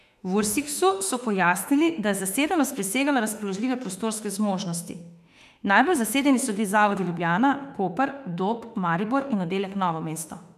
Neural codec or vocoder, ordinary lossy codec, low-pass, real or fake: autoencoder, 48 kHz, 32 numbers a frame, DAC-VAE, trained on Japanese speech; none; 14.4 kHz; fake